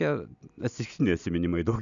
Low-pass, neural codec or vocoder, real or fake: 7.2 kHz; none; real